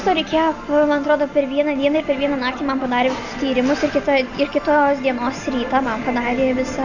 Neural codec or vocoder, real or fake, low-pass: none; real; 7.2 kHz